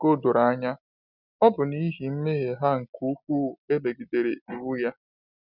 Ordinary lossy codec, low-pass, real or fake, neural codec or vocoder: none; 5.4 kHz; real; none